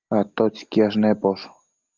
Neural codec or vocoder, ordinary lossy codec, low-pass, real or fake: none; Opus, 32 kbps; 7.2 kHz; real